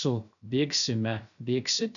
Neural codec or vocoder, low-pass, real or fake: codec, 16 kHz, 0.3 kbps, FocalCodec; 7.2 kHz; fake